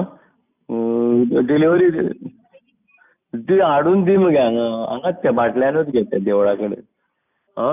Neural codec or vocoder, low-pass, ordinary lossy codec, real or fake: none; 3.6 kHz; none; real